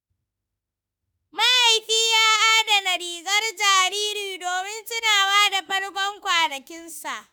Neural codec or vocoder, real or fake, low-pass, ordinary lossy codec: autoencoder, 48 kHz, 32 numbers a frame, DAC-VAE, trained on Japanese speech; fake; none; none